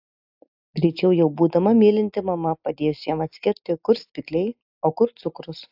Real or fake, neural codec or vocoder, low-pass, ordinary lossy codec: real; none; 5.4 kHz; AAC, 48 kbps